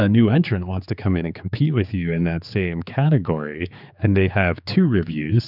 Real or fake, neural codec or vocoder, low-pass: fake; codec, 16 kHz, 4 kbps, X-Codec, HuBERT features, trained on general audio; 5.4 kHz